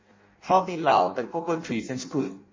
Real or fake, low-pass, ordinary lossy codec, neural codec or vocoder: fake; 7.2 kHz; MP3, 32 kbps; codec, 16 kHz in and 24 kHz out, 0.6 kbps, FireRedTTS-2 codec